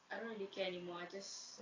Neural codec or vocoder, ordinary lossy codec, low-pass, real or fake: none; AAC, 32 kbps; 7.2 kHz; real